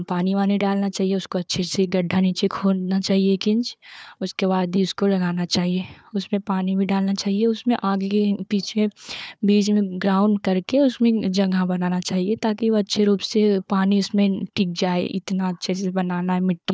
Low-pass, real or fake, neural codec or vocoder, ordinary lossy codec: none; fake; codec, 16 kHz, 4 kbps, FunCodec, trained on Chinese and English, 50 frames a second; none